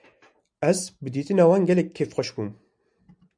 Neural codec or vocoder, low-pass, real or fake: none; 9.9 kHz; real